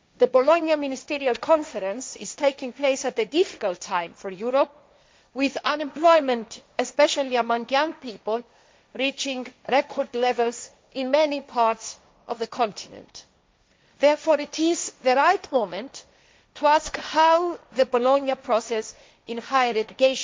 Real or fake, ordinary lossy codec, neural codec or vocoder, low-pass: fake; none; codec, 16 kHz, 1.1 kbps, Voila-Tokenizer; none